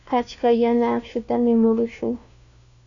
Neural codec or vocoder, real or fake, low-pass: codec, 16 kHz, 1 kbps, FunCodec, trained on LibriTTS, 50 frames a second; fake; 7.2 kHz